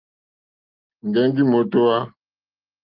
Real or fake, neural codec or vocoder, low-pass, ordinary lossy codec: real; none; 5.4 kHz; Opus, 32 kbps